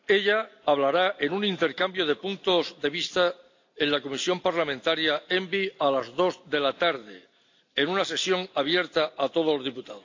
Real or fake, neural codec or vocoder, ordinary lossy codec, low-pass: real; none; AAC, 48 kbps; 7.2 kHz